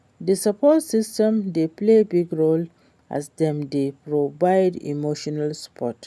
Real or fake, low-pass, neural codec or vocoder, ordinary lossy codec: real; none; none; none